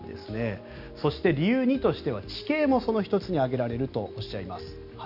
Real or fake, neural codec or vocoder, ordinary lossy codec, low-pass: real; none; none; 5.4 kHz